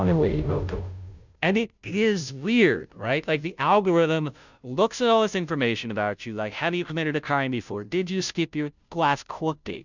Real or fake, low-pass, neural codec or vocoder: fake; 7.2 kHz; codec, 16 kHz, 0.5 kbps, FunCodec, trained on Chinese and English, 25 frames a second